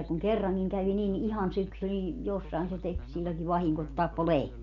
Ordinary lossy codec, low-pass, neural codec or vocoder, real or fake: none; 7.2 kHz; none; real